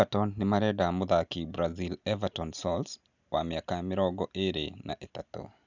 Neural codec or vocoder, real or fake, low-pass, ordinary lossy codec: none; real; 7.2 kHz; none